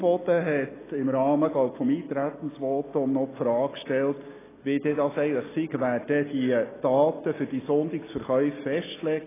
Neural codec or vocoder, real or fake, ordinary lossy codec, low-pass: none; real; AAC, 16 kbps; 3.6 kHz